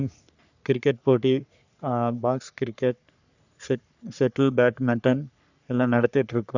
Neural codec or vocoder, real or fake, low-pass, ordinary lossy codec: codec, 44.1 kHz, 3.4 kbps, Pupu-Codec; fake; 7.2 kHz; none